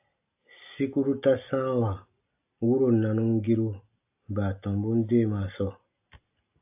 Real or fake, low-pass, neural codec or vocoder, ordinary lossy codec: real; 3.6 kHz; none; AAC, 32 kbps